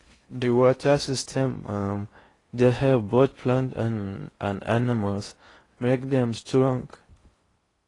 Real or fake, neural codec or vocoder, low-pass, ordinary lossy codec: fake; codec, 16 kHz in and 24 kHz out, 0.6 kbps, FocalCodec, streaming, 4096 codes; 10.8 kHz; AAC, 32 kbps